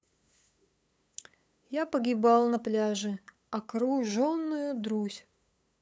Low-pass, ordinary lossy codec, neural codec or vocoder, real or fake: none; none; codec, 16 kHz, 8 kbps, FunCodec, trained on LibriTTS, 25 frames a second; fake